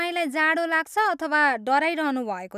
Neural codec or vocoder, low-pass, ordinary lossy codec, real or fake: none; 14.4 kHz; none; real